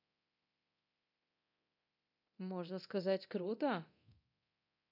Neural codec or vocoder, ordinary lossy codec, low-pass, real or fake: codec, 24 kHz, 0.9 kbps, DualCodec; none; 5.4 kHz; fake